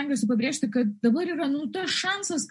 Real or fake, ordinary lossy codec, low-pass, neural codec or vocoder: real; MP3, 48 kbps; 10.8 kHz; none